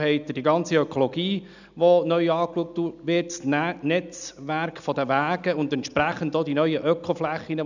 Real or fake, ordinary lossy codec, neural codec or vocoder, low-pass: real; none; none; 7.2 kHz